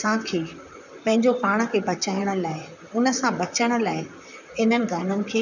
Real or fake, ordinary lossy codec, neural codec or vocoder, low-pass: fake; none; vocoder, 44.1 kHz, 128 mel bands, Pupu-Vocoder; 7.2 kHz